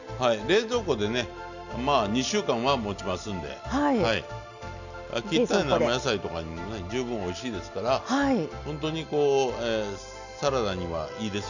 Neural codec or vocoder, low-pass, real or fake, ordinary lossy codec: none; 7.2 kHz; real; none